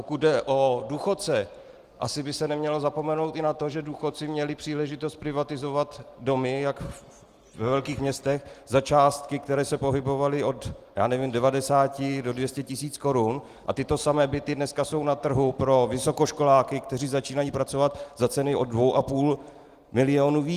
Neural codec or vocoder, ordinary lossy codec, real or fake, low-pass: none; Opus, 24 kbps; real; 14.4 kHz